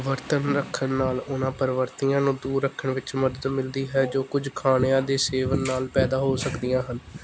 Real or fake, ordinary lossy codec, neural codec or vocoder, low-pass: real; none; none; none